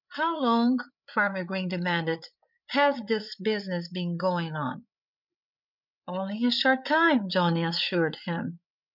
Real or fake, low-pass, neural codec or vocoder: fake; 5.4 kHz; codec, 16 kHz, 8 kbps, FreqCodec, larger model